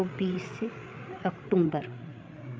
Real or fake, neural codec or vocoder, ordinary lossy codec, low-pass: fake; codec, 16 kHz, 8 kbps, FreqCodec, larger model; none; none